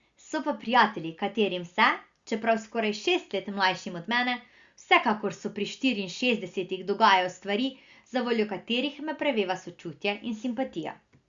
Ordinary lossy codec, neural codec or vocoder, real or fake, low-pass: Opus, 64 kbps; none; real; 7.2 kHz